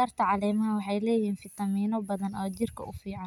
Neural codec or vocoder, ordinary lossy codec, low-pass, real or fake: none; none; 19.8 kHz; real